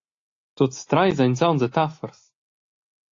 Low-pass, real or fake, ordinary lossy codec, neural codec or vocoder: 7.2 kHz; real; AAC, 48 kbps; none